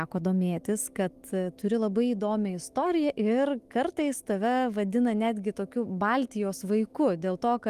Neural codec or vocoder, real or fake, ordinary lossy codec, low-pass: autoencoder, 48 kHz, 128 numbers a frame, DAC-VAE, trained on Japanese speech; fake; Opus, 24 kbps; 14.4 kHz